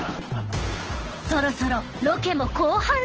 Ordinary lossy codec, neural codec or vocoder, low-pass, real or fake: Opus, 16 kbps; codec, 16 kHz, 8 kbps, FunCodec, trained on Chinese and English, 25 frames a second; 7.2 kHz; fake